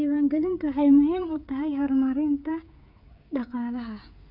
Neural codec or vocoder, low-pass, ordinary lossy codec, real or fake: codec, 16 kHz, 16 kbps, FreqCodec, smaller model; 5.4 kHz; none; fake